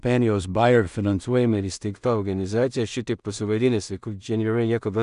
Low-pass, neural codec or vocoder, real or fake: 10.8 kHz; codec, 16 kHz in and 24 kHz out, 0.4 kbps, LongCat-Audio-Codec, two codebook decoder; fake